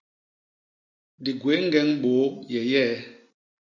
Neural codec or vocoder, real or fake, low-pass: none; real; 7.2 kHz